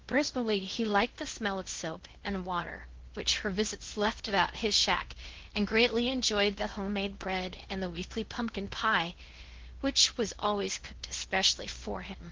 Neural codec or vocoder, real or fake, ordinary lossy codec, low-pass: codec, 16 kHz in and 24 kHz out, 0.6 kbps, FocalCodec, streaming, 2048 codes; fake; Opus, 16 kbps; 7.2 kHz